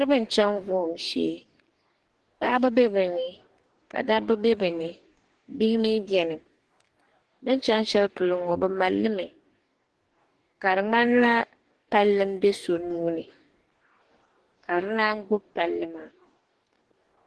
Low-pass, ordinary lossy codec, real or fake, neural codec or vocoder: 10.8 kHz; Opus, 16 kbps; fake; codec, 44.1 kHz, 2.6 kbps, DAC